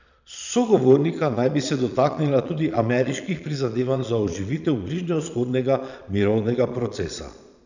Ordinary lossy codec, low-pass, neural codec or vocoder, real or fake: none; 7.2 kHz; vocoder, 22.05 kHz, 80 mel bands, Vocos; fake